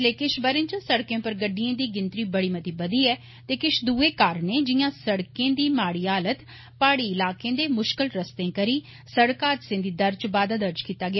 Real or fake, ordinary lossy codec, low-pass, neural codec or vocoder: real; MP3, 24 kbps; 7.2 kHz; none